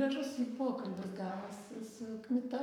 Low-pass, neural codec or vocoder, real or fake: 19.8 kHz; codec, 44.1 kHz, 7.8 kbps, Pupu-Codec; fake